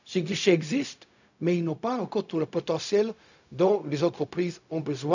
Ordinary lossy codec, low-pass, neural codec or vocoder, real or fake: none; 7.2 kHz; codec, 16 kHz, 0.4 kbps, LongCat-Audio-Codec; fake